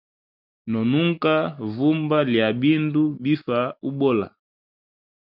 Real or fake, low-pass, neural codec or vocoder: real; 5.4 kHz; none